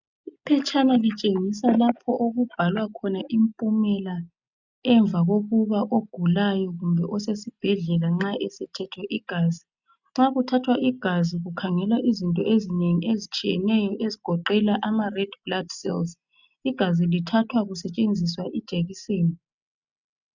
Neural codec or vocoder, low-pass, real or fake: none; 7.2 kHz; real